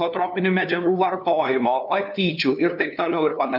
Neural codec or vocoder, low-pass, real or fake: codec, 16 kHz, 2 kbps, FunCodec, trained on LibriTTS, 25 frames a second; 5.4 kHz; fake